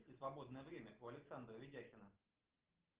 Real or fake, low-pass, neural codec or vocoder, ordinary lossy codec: real; 3.6 kHz; none; Opus, 16 kbps